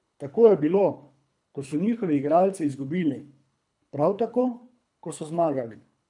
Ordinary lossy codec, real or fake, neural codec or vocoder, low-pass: none; fake; codec, 24 kHz, 3 kbps, HILCodec; 10.8 kHz